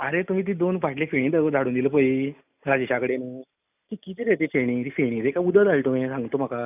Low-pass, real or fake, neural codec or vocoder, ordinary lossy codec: 3.6 kHz; real; none; none